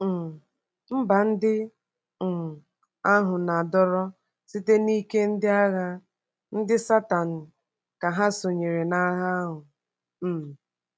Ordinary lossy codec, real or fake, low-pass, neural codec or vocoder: none; real; none; none